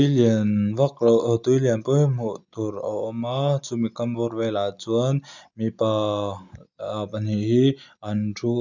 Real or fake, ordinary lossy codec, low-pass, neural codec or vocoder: real; none; 7.2 kHz; none